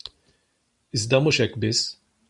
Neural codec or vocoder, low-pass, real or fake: vocoder, 44.1 kHz, 128 mel bands every 512 samples, BigVGAN v2; 10.8 kHz; fake